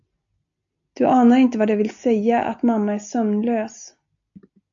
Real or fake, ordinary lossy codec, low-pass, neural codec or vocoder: real; AAC, 64 kbps; 7.2 kHz; none